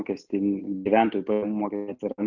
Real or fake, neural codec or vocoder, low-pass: real; none; 7.2 kHz